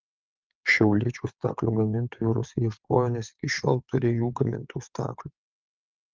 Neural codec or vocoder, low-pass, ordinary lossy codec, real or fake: vocoder, 22.05 kHz, 80 mel bands, WaveNeXt; 7.2 kHz; Opus, 32 kbps; fake